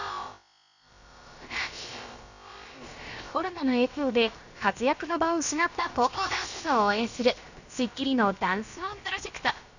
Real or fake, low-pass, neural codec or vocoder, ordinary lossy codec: fake; 7.2 kHz; codec, 16 kHz, about 1 kbps, DyCAST, with the encoder's durations; none